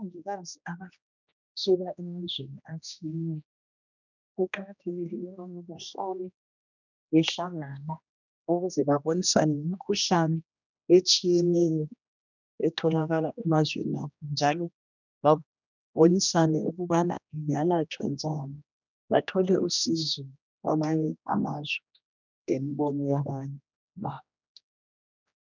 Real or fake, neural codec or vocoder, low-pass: fake; codec, 16 kHz, 1 kbps, X-Codec, HuBERT features, trained on general audio; 7.2 kHz